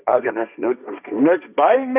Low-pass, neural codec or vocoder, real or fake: 3.6 kHz; codec, 16 kHz, 1.1 kbps, Voila-Tokenizer; fake